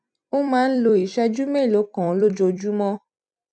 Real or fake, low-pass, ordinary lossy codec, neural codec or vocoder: fake; 9.9 kHz; none; vocoder, 44.1 kHz, 128 mel bands every 256 samples, BigVGAN v2